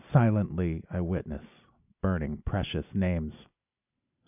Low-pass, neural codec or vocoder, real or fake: 3.6 kHz; none; real